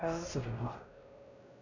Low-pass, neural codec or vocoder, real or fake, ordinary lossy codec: 7.2 kHz; codec, 16 kHz, 1 kbps, X-Codec, WavLM features, trained on Multilingual LibriSpeech; fake; none